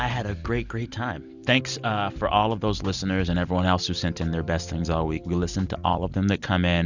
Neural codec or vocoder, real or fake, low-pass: none; real; 7.2 kHz